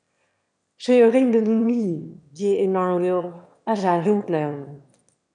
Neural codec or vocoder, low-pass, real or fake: autoencoder, 22.05 kHz, a latent of 192 numbers a frame, VITS, trained on one speaker; 9.9 kHz; fake